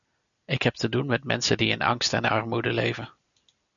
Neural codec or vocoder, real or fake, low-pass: none; real; 7.2 kHz